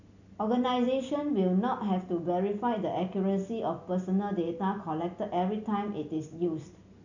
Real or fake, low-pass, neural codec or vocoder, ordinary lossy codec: real; 7.2 kHz; none; none